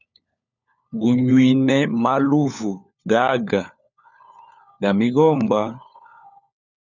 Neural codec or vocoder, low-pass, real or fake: codec, 16 kHz, 4 kbps, FunCodec, trained on LibriTTS, 50 frames a second; 7.2 kHz; fake